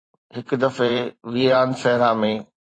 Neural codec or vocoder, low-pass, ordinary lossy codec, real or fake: vocoder, 44.1 kHz, 128 mel bands every 512 samples, BigVGAN v2; 9.9 kHz; AAC, 32 kbps; fake